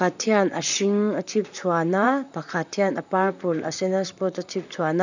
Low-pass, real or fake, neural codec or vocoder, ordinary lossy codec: 7.2 kHz; fake; vocoder, 44.1 kHz, 128 mel bands, Pupu-Vocoder; none